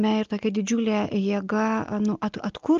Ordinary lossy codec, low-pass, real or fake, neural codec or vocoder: Opus, 24 kbps; 7.2 kHz; real; none